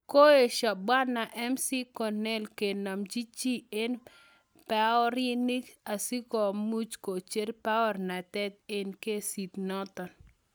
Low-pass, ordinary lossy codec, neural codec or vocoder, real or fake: none; none; none; real